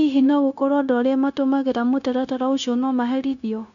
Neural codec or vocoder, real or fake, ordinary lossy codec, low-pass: codec, 16 kHz, 0.9 kbps, LongCat-Audio-Codec; fake; none; 7.2 kHz